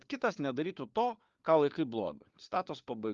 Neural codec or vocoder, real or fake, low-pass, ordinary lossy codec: codec, 16 kHz, 4 kbps, FunCodec, trained on LibriTTS, 50 frames a second; fake; 7.2 kHz; Opus, 32 kbps